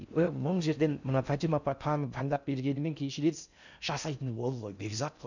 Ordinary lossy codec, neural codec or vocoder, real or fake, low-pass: none; codec, 16 kHz in and 24 kHz out, 0.6 kbps, FocalCodec, streaming, 4096 codes; fake; 7.2 kHz